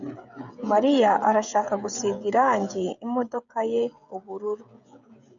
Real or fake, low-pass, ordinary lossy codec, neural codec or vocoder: fake; 7.2 kHz; MP3, 96 kbps; codec, 16 kHz, 16 kbps, FreqCodec, smaller model